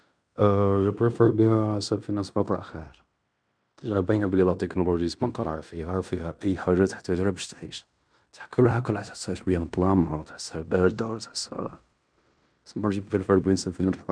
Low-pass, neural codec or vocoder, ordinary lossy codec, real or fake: 9.9 kHz; codec, 16 kHz in and 24 kHz out, 0.9 kbps, LongCat-Audio-Codec, fine tuned four codebook decoder; none; fake